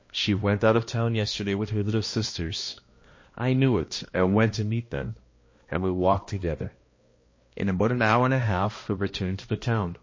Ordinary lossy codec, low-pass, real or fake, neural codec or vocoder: MP3, 32 kbps; 7.2 kHz; fake; codec, 16 kHz, 1 kbps, X-Codec, HuBERT features, trained on balanced general audio